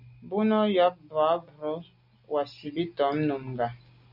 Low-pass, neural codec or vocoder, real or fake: 5.4 kHz; none; real